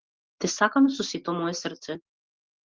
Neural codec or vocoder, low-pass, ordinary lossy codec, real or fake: none; 7.2 kHz; Opus, 16 kbps; real